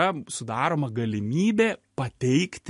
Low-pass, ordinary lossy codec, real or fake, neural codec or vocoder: 14.4 kHz; MP3, 48 kbps; real; none